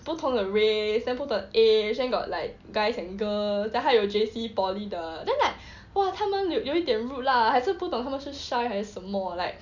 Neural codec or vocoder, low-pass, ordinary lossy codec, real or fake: none; 7.2 kHz; none; real